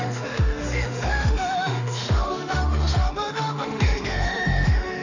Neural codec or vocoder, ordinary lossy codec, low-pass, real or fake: autoencoder, 48 kHz, 32 numbers a frame, DAC-VAE, trained on Japanese speech; none; 7.2 kHz; fake